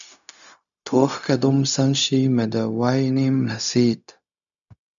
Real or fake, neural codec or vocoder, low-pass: fake; codec, 16 kHz, 0.4 kbps, LongCat-Audio-Codec; 7.2 kHz